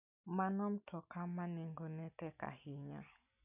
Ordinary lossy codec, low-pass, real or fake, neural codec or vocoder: none; 3.6 kHz; real; none